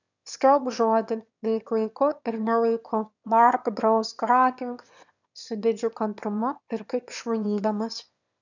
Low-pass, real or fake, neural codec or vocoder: 7.2 kHz; fake; autoencoder, 22.05 kHz, a latent of 192 numbers a frame, VITS, trained on one speaker